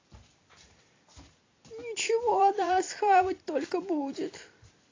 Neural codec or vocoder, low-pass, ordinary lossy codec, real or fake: vocoder, 44.1 kHz, 128 mel bands every 256 samples, BigVGAN v2; 7.2 kHz; AAC, 32 kbps; fake